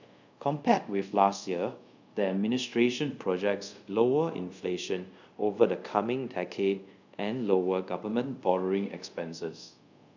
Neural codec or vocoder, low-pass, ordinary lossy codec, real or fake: codec, 24 kHz, 0.5 kbps, DualCodec; 7.2 kHz; none; fake